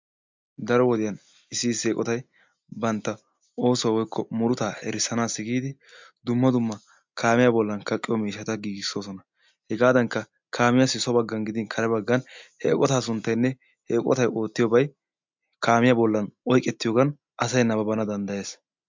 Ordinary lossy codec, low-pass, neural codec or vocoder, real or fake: MP3, 64 kbps; 7.2 kHz; none; real